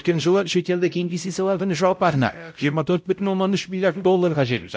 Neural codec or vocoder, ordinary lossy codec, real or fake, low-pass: codec, 16 kHz, 0.5 kbps, X-Codec, WavLM features, trained on Multilingual LibriSpeech; none; fake; none